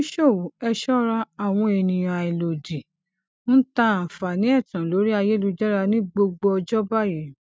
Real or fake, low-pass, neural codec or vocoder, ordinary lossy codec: real; none; none; none